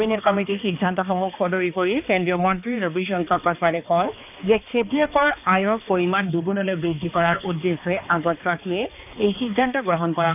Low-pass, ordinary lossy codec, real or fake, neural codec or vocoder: 3.6 kHz; none; fake; codec, 16 kHz, 2 kbps, X-Codec, HuBERT features, trained on balanced general audio